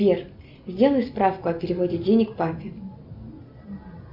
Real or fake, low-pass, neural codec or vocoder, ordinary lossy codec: real; 5.4 kHz; none; MP3, 48 kbps